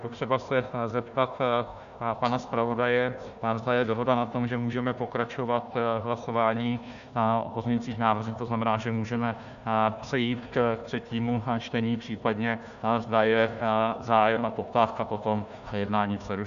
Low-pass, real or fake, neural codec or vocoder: 7.2 kHz; fake; codec, 16 kHz, 1 kbps, FunCodec, trained on Chinese and English, 50 frames a second